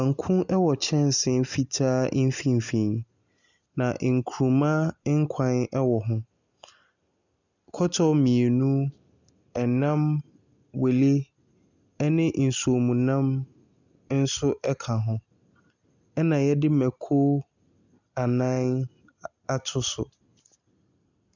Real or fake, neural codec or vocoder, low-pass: real; none; 7.2 kHz